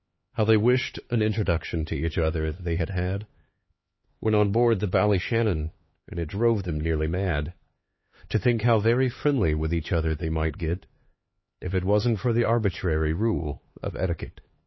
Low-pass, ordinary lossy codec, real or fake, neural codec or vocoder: 7.2 kHz; MP3, 24 kbps; fake; codec, 16 kHz, 4 kbps, X-Codec, HuBERT features, trained on LibriSpeech